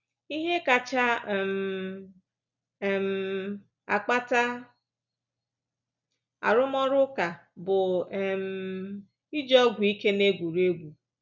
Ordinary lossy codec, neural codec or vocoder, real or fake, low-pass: none; none; real; 7.2 kHz